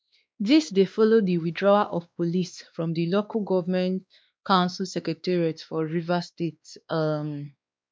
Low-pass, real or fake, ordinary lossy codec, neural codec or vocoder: none; fake; none; codec, 16 kHz, 2 kbps, X-Codec, WavLM features, trained on Multilingual LibriSpeech